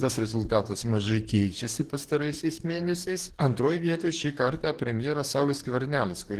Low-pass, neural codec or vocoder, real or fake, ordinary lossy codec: 14.4 kHz; codec, 44.1 kHz, 2.6 kbps, DAC; fake; Opus, 16 kbps